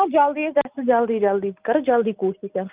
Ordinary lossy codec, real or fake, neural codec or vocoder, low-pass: Opus, 32 kbps; real; none; 3.6 kHz